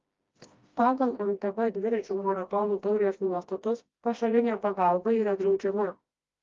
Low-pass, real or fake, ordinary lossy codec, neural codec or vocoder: 7.2 kHz; fake; Opus, 32 kbps; codec, 16 kHz, 1 kbps, FreqCodec, smaller model